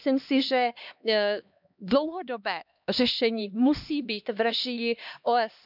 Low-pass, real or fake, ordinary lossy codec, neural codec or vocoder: 5.4 kHz; fake; none; codec, 16 kHz, 2 kbps, X-Codec, HuBERT features, trained on LibriSpeech